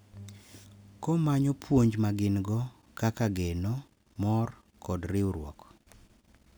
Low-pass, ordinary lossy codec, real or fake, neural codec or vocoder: none; none; real; none